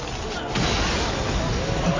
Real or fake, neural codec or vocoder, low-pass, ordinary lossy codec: real; none; 7.2 kHz; AAC, 32 kbps